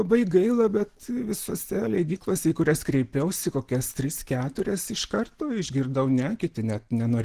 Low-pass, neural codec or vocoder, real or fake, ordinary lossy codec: 14.4 kHz; none; real; Opus, 16 kbps